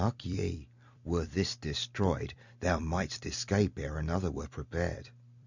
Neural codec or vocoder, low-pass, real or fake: vocoder, 44.1 kHz, 128 mel bands every 256 samples, BigVGAN v2; 7.2 kHz; fake